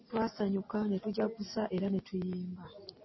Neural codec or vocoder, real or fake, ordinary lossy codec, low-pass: none; real; MP3, 24 kbps; 7.2 kHz